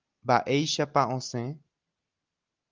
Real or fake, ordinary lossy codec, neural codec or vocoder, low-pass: real; Opus, 32 kbps; none; 7.2 kHz